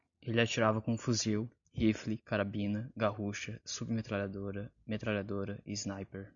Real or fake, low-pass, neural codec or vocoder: real; 7.2 kHz; none